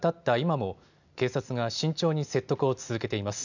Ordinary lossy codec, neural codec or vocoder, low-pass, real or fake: none; none; 7.2 kHz; real